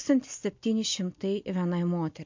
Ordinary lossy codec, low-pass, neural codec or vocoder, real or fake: MP3, 48 kbps; 7.2 kHz; none; real